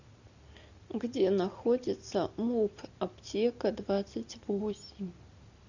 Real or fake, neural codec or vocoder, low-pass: real; none; 7.2 kHz